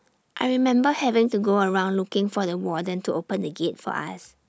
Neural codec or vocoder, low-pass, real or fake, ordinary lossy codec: none; none; real; none